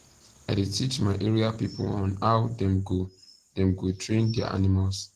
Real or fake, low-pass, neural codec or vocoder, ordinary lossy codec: real; 14.4 kHz; none; Opus, 16 kbps